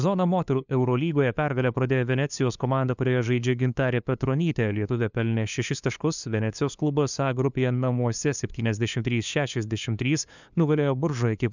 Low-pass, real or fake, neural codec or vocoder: 7.2 kHz; fake; codec, 16 kHz, 2 kbps, FunCodec, trained on LibriTTS, 25 frames a second